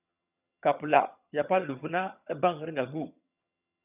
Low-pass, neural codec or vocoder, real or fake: 3.6 kHz; vocoder, 22.05 kHz, 80 mel bands, HiFi-GAN; fake